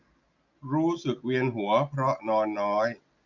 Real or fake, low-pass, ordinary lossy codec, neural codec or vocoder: real; 7.2 kHz; none; none